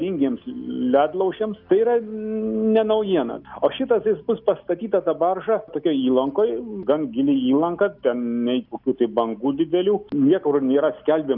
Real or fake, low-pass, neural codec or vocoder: real; 5.4 kHz; none